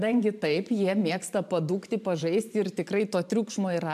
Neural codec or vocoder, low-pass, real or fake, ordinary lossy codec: vocoder, 44.1 kHz, 128 mel bands every 512 samples, BigVGAN v2; 14.4 kHz; fake; MP3, 96 kbps